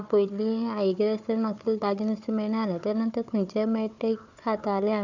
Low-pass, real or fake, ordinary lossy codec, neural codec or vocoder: 7.2 kHz; fake; none; codec, 16 kHz, 4 kbps, FunCodec, trained on Chinese and English, 50 frames a second